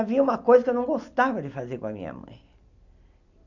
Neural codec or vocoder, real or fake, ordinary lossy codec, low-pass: none; real; none; 7.2 kHz